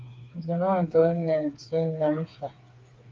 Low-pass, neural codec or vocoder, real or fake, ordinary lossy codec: 7.2 kHz; codec, 16 kHz, 4 kbps, FreqCodec, smaller model; fake; Opus, 24 kbps